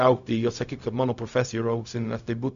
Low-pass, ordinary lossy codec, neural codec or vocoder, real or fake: 7.2 kHz; MP3, 96 kbps; codec, 16 kHz, 0.4 kbps, LongCat-Audio-Codec; fake